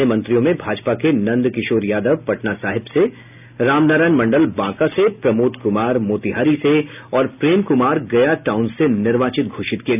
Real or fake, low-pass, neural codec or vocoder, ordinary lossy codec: real; 3.6 kHz; none; none